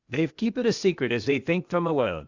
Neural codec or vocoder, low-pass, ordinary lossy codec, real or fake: codec, 16 kHz, 0.8 kbps, ZipCodec; 7.2 kHz; Opus, 64 kbps; fake